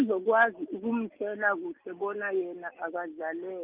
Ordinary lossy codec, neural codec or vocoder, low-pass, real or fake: Opus, 32 kbps; none; 3.6 kHz; real